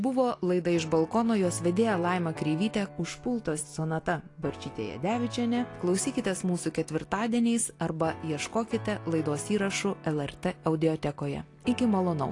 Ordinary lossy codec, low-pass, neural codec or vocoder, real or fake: AAC, 48 kbps; 10.8 kHz; none; real